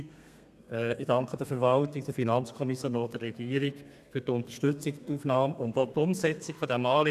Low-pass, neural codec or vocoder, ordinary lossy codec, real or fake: 14.4 kHz; codec, 32 kHz, 1.9 kbps, SNAC; none; fake